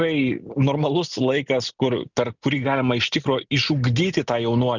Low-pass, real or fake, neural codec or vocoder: 7.2 kHz; real; none